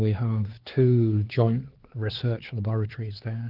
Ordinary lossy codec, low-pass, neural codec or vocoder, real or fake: Opus, 16 kbps; 5.4 kHz; codec, 16 kHz, 4 kbps, X-Codec, HuBERT features, trained on LibriSpeech; fake